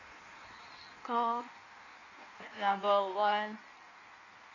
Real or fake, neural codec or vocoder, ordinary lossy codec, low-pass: fake; codec, 16 kHz in and 24 kHz out, 1.1 kbps, FireRedTTS-2 codec; none; 7.2 kHz